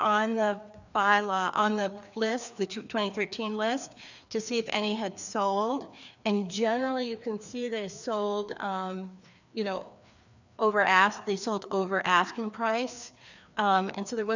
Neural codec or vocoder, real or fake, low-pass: codec, 16 kHz, 2 kbps, FreqCodec, larger model; fake; 7.2 kHz